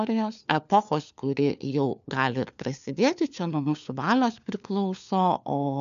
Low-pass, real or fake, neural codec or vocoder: 7.2 kHz; fake; codec, 16 kHz, 4 kbps, FunCodec, trained on LibriTTS, 50 frames a second